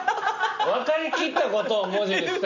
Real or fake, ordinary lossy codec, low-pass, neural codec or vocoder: real; none; 7.2 kHz; none